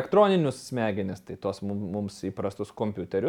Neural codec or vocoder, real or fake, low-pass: none; real; 19.8 kHz